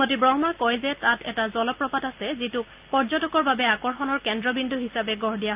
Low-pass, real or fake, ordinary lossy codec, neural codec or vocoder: 3.6 kHz; real; Opus, 24 kbps; none